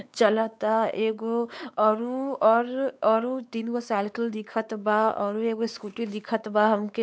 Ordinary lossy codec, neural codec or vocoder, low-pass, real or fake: none; codec, 16 kHz, 4 kbps, X-Codec, WavLM features, trained on Multilingual LibriSpeech; none; fake